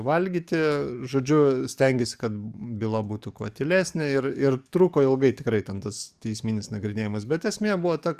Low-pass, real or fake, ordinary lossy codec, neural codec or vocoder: 14.4 kHz; fake; Opus, 64 kbps; codec, 44.1 kHz, 7.8 kbps, DAC